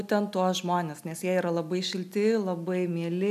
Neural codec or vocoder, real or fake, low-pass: none; real; 14.4 kHz